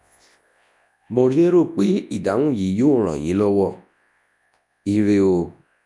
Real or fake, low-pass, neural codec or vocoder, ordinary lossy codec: fake; none; codec, 24 kHz, 0.9 kbps, WavTokenizer, large speech release; none